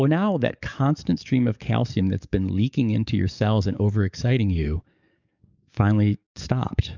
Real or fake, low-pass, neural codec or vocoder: fake; 7.2 kHz; codec, 44.1 kHz, 7.8 kbps, DAC